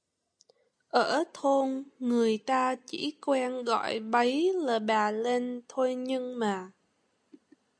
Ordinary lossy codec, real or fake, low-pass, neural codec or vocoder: AAC, 64 kbps; real; 9.9 kHz; none